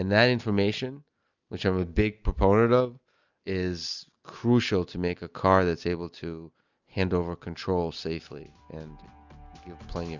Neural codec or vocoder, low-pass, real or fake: none; 7.2 kHz; real